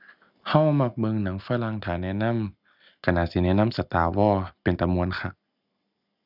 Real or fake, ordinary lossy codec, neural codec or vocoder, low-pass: real; none; none; 5.4 kHz